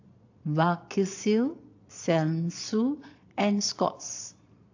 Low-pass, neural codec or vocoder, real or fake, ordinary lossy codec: 7.2 kHz; vocoder, 22.05 kHz, 80 mel bands, Vocos; fake; AAC, 48 kbps